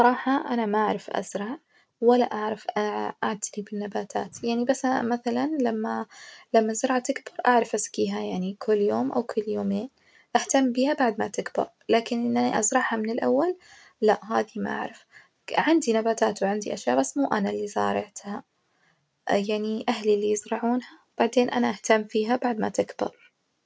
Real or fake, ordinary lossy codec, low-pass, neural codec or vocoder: real; none; none; none